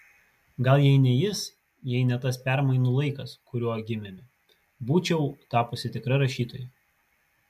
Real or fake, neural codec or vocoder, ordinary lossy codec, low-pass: real; none; MP3, 96 kbps; 14.4 kHz